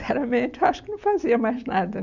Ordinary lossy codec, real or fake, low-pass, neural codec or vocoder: none; real; 7.2 kHz; none